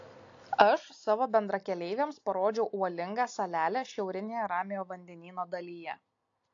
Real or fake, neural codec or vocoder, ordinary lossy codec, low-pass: real; none; AAC, 48 kbps; 7.2 kHz